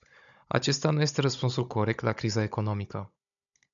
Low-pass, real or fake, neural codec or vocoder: 7.2 kHz; fake; codec, 16 kHz, 16 kbps, FunCodec, trained on Chinese and English, 50 frames a second